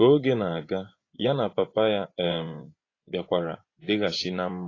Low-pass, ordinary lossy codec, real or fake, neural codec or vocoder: 7.2 kHz; AAC, 32 kbps; fake; vocoder, 24 kHz, 100 mel bands, Vocos